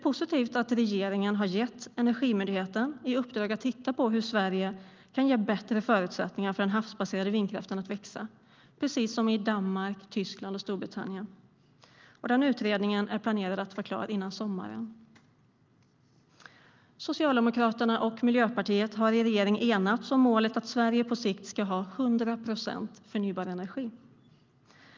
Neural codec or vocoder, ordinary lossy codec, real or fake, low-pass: none; Opus, 24 kbps; real; 7.2 kHz